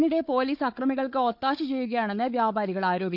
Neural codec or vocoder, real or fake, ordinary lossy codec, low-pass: codec, 16 kHz, 4 kbps, FunCodec, trained on Chinese and English, 50 frames a second; fake; none; 5.4 kHz